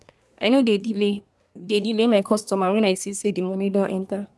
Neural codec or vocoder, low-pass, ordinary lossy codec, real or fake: codec, 24 kHz, 1 kbps, SNAC; none; none; fake